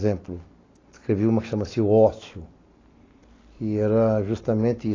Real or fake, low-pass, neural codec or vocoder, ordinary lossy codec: real; 7.2 kHz; none; AAC, 32 kbps